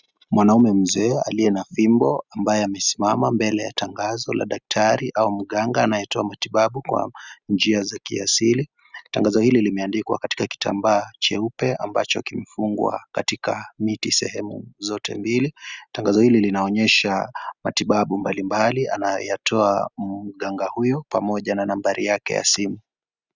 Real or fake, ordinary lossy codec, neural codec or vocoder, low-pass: real; Opus, 64 kbps; none; 7.2 kHz